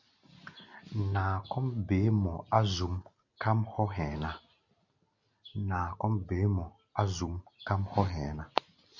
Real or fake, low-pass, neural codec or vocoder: real; 7.2 kHz; none